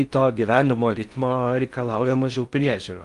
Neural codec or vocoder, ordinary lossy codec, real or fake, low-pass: codec, 16 kHz in and 24 kHz out, 0.6 kbps, FocalCodec, streaming, 4096 codes; Opus, 32 kbps; fake; 10.8 kHz